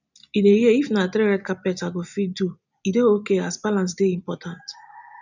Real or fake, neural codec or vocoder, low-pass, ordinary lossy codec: real; none; 7.2 kHz; none